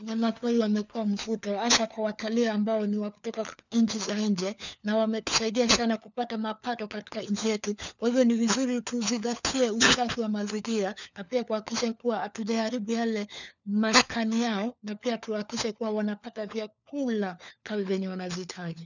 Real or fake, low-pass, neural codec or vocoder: fake; 7.2 kHz; codec, 16 kHz, 4 kbps, FunCodec, trained on LibriTTS, 50 frames a second